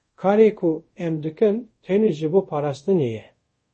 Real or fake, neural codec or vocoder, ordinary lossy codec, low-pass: fake; codec, 24 kHz, 0.5 kbps, DualCodec; MP3, 32 kbps; 10.8 kHz